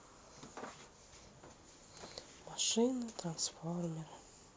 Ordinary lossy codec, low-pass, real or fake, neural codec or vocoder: none; none; real; none